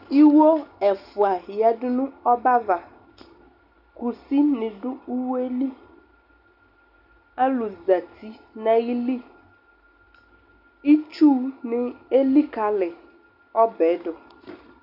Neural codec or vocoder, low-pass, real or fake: none; 5.4 kHz; real